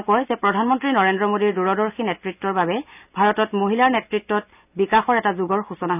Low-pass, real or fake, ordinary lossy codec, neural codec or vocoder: 3.6 kHz; real; none; none